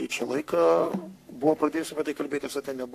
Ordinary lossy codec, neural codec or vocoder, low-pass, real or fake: AAC, 64 kbps; codec, 44.1 kHz, 3.4 kbps, Pupu-Codec; 14.4 kHz; fake